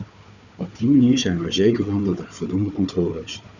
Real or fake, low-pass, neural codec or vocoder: fake; 7.2 kHz; codec, 16 kHz, 4 kbps, FunCodec, trained on Chinese and English, 50 frames a second